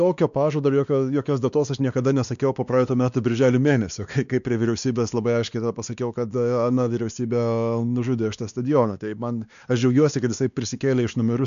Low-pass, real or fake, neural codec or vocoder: 7.2 kHz; fake; codec, 16 kHz, 2 kbps, X-Codec, WavLM features, trained on Multilingual LibriSpeech